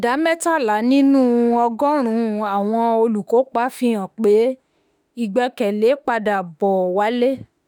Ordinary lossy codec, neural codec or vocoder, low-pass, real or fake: none; autoencoder, 48 kHz, 32 numbers a frame, DAC-VAE, trained on Japanese speech; none; fake